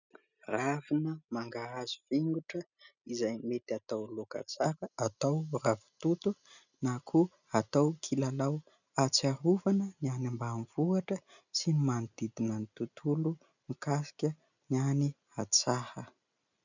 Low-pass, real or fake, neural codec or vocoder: 7.2 kHz; real; none